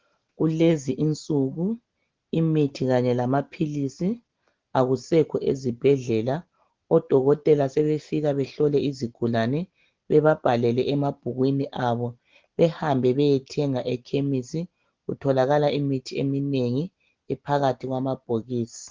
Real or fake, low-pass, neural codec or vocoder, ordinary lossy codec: real; 7.2 kHz; none; Opus, 16 kbps